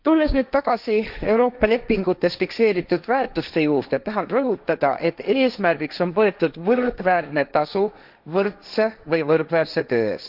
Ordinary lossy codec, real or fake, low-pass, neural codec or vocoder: none; fake; 5.4 kHz; codec, 16 kHz, 1.1 kbps, Voila-Tokenizer